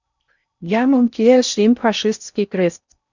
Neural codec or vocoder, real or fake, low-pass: codec, 16 kHz in and 24 kHz out, 0.6 kbps, FocalCodec, streaming, 4096 codes; fake; 7.2 kHz